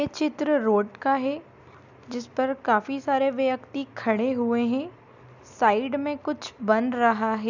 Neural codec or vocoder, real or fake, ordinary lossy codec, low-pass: none; real; none; 7.2 kHz